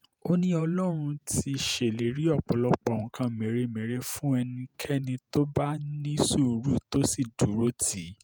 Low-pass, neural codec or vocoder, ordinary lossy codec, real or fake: none; vocoder, 48 kHz, 128 mel bands, Vocos; none; fake